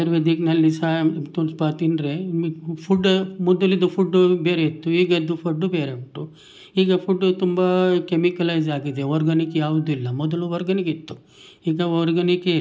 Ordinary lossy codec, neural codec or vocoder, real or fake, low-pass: none; none; real; none